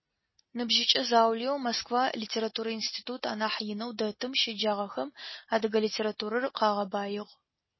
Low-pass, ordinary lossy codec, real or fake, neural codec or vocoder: 7.2 kHz; MP3, 24 kbps; real; none